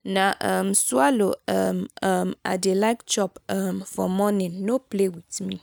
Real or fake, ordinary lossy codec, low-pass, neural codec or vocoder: real; none; none; none